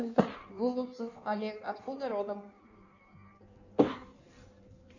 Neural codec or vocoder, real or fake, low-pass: codec, 16 kHz in and 24 kHz out, 1.1 kbps, FireRedTTS-2 codec; fake; 7.2 kHz